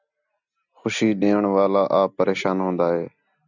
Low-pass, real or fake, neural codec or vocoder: 7.2 kHz; real; none